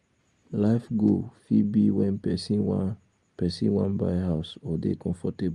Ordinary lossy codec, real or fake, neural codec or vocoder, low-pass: Opus, 32 kbps; real; none; 10.8 kHz